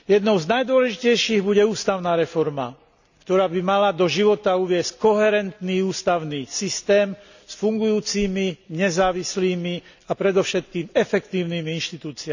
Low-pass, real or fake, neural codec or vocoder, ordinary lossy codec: 7.2 kHz; real; none; none